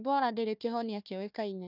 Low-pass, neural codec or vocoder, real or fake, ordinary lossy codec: 5.4 kHz; codec, 16 kHz, 1 kbps, FunCodec, trained on Chinese and English, 50 frames a second; fake; none